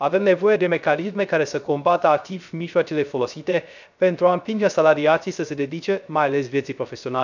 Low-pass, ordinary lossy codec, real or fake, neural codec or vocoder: 7.2 kHz; none; fake; codec, 16 kHz, 0.3 kbps, FocalCodec